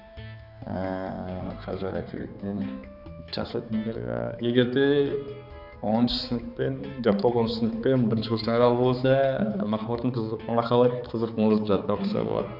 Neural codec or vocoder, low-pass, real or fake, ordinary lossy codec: codec, 16 kHz, 4 kbps, X-Codec, HuBERT features, trained on balanced general audio; 5.4 kHz; fake; none